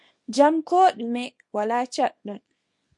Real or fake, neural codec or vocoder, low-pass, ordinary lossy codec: fake; codec, 24 kHz, 0.9 kbps, WavTokenizer, small release; 10.8 kHz; MP3, 48 kbps